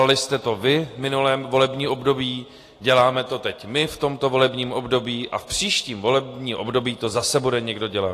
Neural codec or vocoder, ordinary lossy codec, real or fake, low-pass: none; AAC, 48 kbps; real; 14.4 kHz